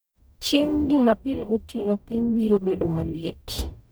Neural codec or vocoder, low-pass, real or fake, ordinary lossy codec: codec, 44.1 kHz, 0.9 kbps, DAC; none; fake; none